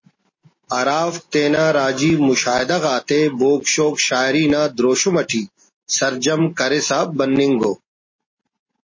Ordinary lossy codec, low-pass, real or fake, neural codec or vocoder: MP3, 32 kbps; 7.2 kHz; real; none